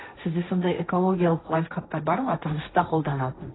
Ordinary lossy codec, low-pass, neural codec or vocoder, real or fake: AAC, 16 kbps; 7.2 kHz; codec, 16 kHz, 1.1 kbps, Voila-Tokenizer; fake